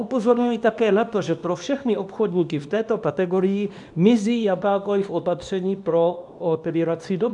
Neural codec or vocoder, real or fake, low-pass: codec, 24 kHz, 0.9 kbps, WavTokenizer, small release; fake; 10.8 kHz